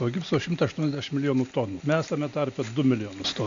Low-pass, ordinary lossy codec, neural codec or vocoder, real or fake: 7.2 kHz; MP3, 64 kbps; none; real